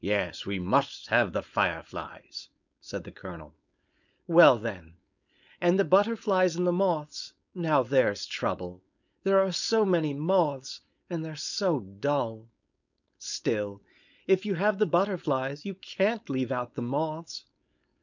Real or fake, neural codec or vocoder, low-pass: fake; codec, 16 kHz, 4.8 kbps, FACodec; 7.2 kHz